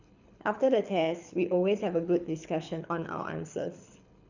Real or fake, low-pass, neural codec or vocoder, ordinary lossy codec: fake; 7.2 kHz; codec, 24 kHz, 6 kbps, HILCodec; none